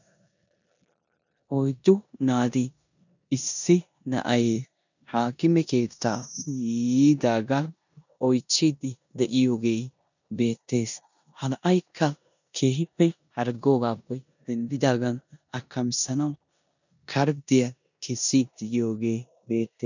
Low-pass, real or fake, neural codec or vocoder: 7.2 kHz; fake; codec, 16 kHz in and 24 kHz out, 0.9 kbps, LongCat-Audio-Codec, four codebook decoder